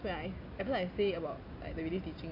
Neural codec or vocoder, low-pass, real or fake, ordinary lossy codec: none; 5.4 kHz; real; AAC, 32 kbps